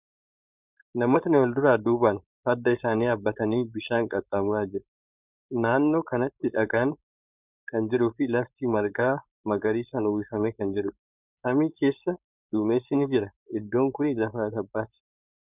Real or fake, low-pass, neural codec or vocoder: fake; 3.6 kHz; codec, 16 kHz, 4.8 kbps, FACodec